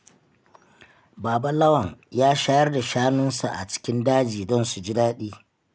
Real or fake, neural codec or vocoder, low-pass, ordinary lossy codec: real; none; none; none